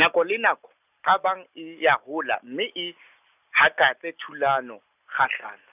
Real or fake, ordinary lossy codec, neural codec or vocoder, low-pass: real; none; none; 3.6 kHz